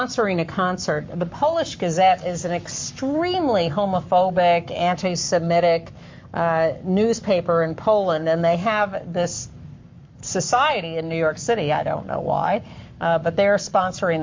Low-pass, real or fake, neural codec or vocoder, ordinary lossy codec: 7.2 kHz; fake; codec, 44.1 kHz, 7.8 kbps, Pupu-Codec; MP3, 48 kbps